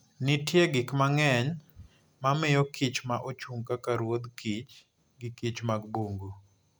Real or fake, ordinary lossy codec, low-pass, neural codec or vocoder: real; none; none; none